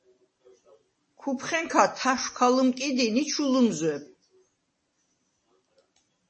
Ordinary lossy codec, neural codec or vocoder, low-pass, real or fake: MP3, 32 kbps; none; 9.9 kHz; real